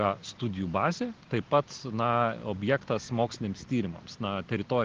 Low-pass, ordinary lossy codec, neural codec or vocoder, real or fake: 7.2 kHz; Opus, 16 kbps; none; real